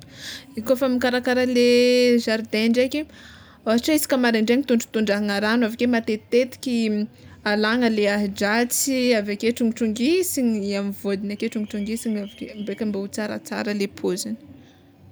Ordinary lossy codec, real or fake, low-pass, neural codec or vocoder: none; real; none; none